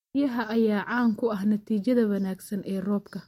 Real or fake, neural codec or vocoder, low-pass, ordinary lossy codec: real; none; 19.8 kHz; MP3, 64 kbps